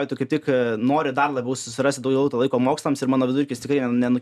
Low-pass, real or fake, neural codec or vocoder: 14.4 kHz; real; none